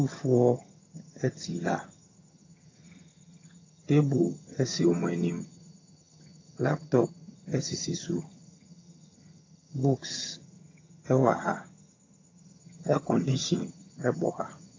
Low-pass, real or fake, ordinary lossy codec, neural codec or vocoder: 7.2 kHz; fake; AAC, 32 kbps; vocoder, 22.05 kHz, 80 mel bands, HiFi-GAN